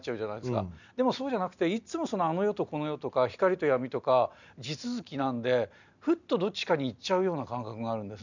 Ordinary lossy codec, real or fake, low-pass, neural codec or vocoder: none; real; 7.2 kHz; none